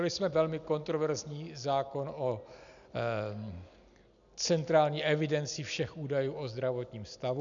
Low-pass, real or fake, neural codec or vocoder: 7.2 kHz; real; none